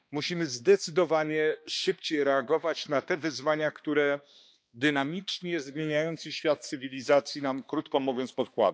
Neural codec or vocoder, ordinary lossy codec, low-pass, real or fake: codec, 16 kHz, 2 kbps, X-Codec, HuBERT features, trained on balanced general audio; none; none; fake